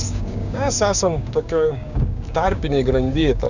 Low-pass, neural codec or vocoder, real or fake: 7.2 kHz; codec, 16 kHz, 6 kbps, DAC; fake